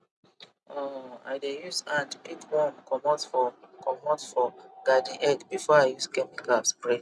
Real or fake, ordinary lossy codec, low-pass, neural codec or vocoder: real; none; none; none